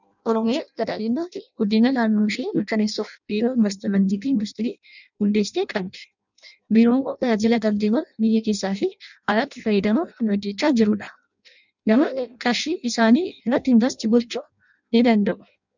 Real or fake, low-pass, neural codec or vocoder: fake; 7.2 kHz; codec, 16 kHz in and 24 kHz out, 0.6 kbps, FireRedTTS-2 codec